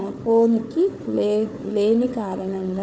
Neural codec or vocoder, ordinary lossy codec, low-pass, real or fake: codec, 16 kHz, 4 kbps, FunCodec, trained on Chinese and English, 50 frames a second; none; none; fake